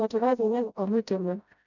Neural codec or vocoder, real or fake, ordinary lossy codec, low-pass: codec, 16 kHz, 0.5 kbps, FreqCodec, smaller model; fake; none; 7.2 kHz